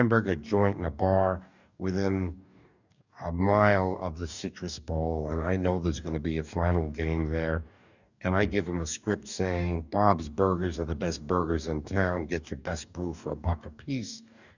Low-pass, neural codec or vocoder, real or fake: 7.2 kHz; codec, 44.1 kHz, 2.6 kbps, DAC; fake